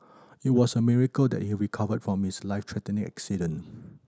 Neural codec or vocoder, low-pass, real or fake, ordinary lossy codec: none; none; real; none